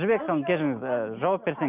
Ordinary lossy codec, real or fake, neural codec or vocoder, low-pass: none; real; none; 3.6 kHz